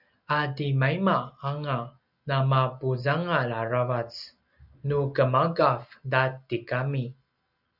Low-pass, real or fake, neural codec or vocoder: 5.4 kHz; real; none